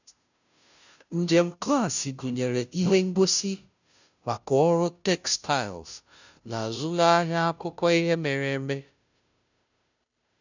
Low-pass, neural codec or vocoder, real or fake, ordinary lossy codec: 7.2 kHz; codec, 16 kHz, 0.5 kbps, FunCodec, trained on Chinese and English, 25 frames a second; fake; none